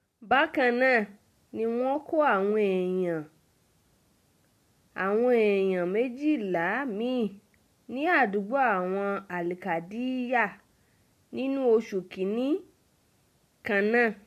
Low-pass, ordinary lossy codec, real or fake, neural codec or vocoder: 14.4 kHz; MP3, 64 kbps; real; none